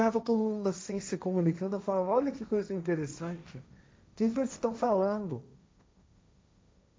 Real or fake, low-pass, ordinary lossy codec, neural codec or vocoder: fake; none; none; codec, 16 kHz, 1.1 kbps, Voila-Tokenizer